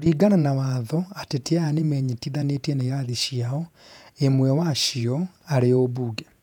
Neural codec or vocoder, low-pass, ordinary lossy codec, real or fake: vocoder, 48 kHz, 128 mel bands, Vocos; 19.8 kHz; none; fake